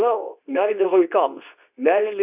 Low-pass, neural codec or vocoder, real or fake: 3.6 kHz; codec, 24 kHz, 0.9 kbps, WavTokenizer, medium speech release version 2; fake